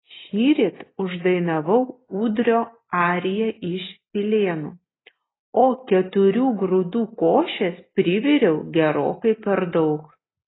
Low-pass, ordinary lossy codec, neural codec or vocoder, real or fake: 7.2 kHz; AAC, 16 kbps; none; real